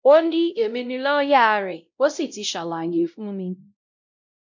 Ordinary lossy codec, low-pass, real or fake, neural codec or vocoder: MP3, 64 kbps; 7.2 kHz; fake; codec, 16 kHz, 0.5 kbps, X-Codec, WavLM features, trained on Multilingual LibriSpeech